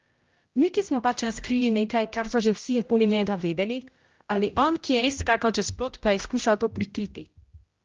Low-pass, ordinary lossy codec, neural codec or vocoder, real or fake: 7.2 kHz; Opus, 32 kbps; codec, 16 kHz, 0.5 kbps, X-Codec, HuBERT features, trained on general audio; fake